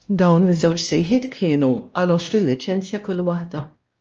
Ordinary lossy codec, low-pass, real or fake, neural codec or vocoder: Opus, 24 kbps; 7.2 kHz; fake; codec, 16 kHz, 1 kbps, X-Codec, WavLM features, trained on Multilingual LibriSpeech